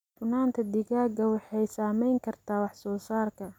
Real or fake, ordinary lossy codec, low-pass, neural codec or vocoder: real; none; 19.8 kHz; none